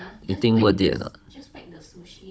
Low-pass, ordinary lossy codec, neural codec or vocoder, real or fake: none; none; codec, 16 kHz, 16 kbps, FunCodec, trained on Chinese and English, 50 frames a second; fake